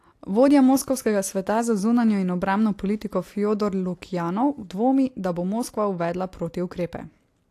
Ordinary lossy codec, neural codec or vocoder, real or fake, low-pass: AAC, 64 kbps; none; real; 14.4 kHz